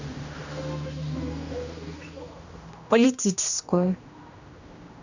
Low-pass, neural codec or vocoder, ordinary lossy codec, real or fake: 7.2 kHz; codec, 16 kHz, 1 kbps, X-Codec, HuBERT features, trained on general audio; none; fake